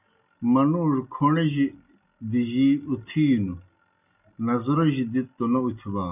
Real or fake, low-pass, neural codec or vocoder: real; 3.6 kHz; none